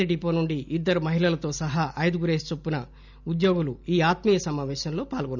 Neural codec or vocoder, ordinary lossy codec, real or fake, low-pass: none; none; real; 7.2 kHz